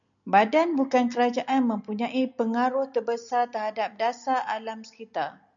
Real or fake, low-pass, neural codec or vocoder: real; 7.2 kHz; none